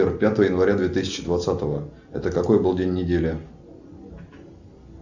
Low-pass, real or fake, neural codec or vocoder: 7.2 kHz; real; none